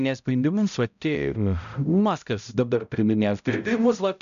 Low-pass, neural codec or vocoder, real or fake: 7.2 kHz; codec, 16 kHz, 0.5 kbps, X-Codec, HuBERT features, trained on balanced general audio; fake